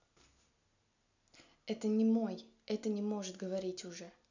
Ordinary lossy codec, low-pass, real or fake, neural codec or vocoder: none; 7.2 kHz; real; none